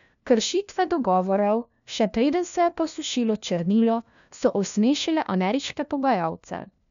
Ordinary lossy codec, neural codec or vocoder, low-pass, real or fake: none; codec, 16 kHz, 1 kbps, FunCodec, trained on LibriTTS, 50 frames a second; 7.2 kHz; fake